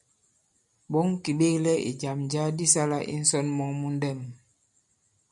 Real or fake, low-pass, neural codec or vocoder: real; 10.8 kHz; none